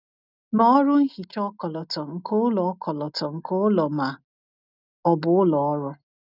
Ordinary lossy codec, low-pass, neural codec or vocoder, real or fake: none; 5.4 kHz; none; real